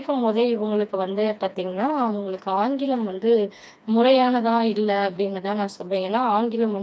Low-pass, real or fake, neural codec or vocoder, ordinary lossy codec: none; fake; codec, 16 kHz, 2 kbps, FreqCodec, smaller model; none